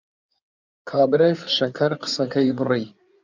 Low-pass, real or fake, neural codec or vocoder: 7.2 kHz; fake; codec, 16 kHz in and 24 kHz out, 2.2 kbps, FireRedTTS-2 codec